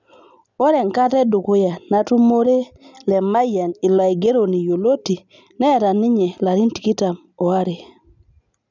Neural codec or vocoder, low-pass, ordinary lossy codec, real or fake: none; 7.2 kHz; none; real